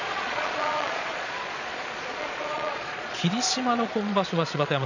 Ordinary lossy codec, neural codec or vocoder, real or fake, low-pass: none; vocoder, 22.05 kHz, 80 mel bands, Vocos; fake; 7.2 kHz